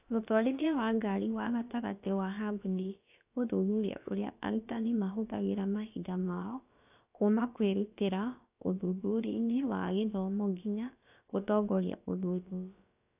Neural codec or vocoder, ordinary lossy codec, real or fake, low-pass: codec, 16 kHz, about 1 kbps, DyCAST, with the encoder's durations; AAC, 32 kbps; fake; 3.6 kHz